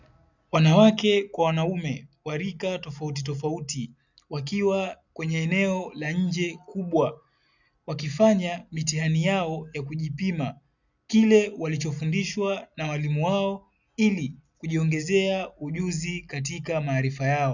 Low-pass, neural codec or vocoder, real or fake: 7.2 kHz; none; real